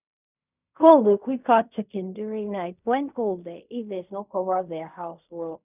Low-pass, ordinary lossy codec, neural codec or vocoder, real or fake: 3.6 kHz; none; codec, 16 kHz in and 24 kHz out, 0.4 kbps, LongCat-Audio-Codec, fine tuned four codebook decoder; fake